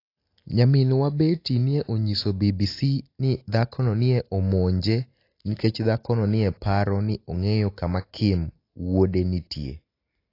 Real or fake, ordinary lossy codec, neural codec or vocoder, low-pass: real; AAC, 32 kbps; none; 5.4 kHz